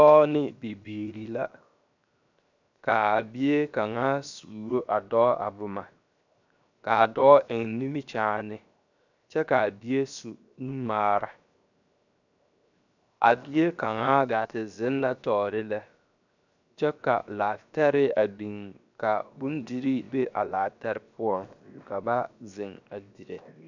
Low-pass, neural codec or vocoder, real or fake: 7.2 kHz; codec, 16 kHz, 0.7 kbps, FocalCodec; fake